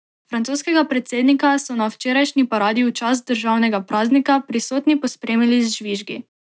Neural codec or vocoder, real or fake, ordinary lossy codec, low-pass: none; real; none; none